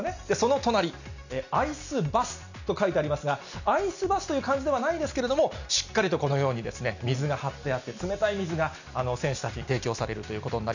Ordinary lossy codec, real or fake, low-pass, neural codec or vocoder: none; real; 7.2 kHz; none